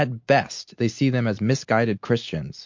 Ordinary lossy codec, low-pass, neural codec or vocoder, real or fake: MP3, 48 kbps; 7.2 kHz; none; real